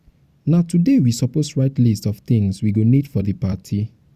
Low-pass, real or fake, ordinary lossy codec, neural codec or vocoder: 14.4 kHz; fake; Opus, 64 kbps; vocoder, 44.1 kHz, 128 mel bands every 256 samples, BigVGAN v2